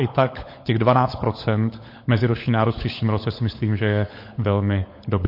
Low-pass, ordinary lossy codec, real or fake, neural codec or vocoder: 5.4 kHz; MP3, 32 kbps; fake; codec, 16 kHz, 16 kbps, FunCodec, trained on LibriTTS, 50 frames a second